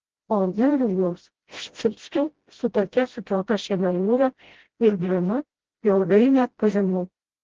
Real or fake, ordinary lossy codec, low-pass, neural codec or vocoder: fake; Opus, 16 kbps; 7.2 kHz; codec, 16 kHz, 0.5 kbps, FreqCodec, smaller model